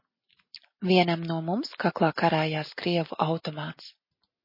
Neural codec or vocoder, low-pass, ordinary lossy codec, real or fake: none; 5.4 kHz; MP3, 24 kbps; real